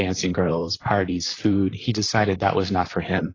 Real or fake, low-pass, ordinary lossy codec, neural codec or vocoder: fake; 7.2 kHz; AAC, 32 kbps; vocoder, 44.1 kHz, 128 mel bands, Pupu-Vocoder